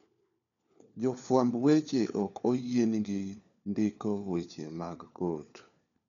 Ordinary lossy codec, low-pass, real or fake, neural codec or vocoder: MP3, 96 kbps; 7.2 kHz; fake; codec, 16 kHz, 4 kbps, FunCodec, trained on LibriTTS, 50 frames a second